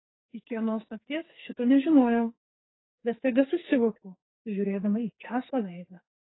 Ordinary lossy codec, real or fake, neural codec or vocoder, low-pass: AAC, 16 kbps; fake; codec, 44.1 kHz, 2.6 kbps, SNAC; 7.2 kHz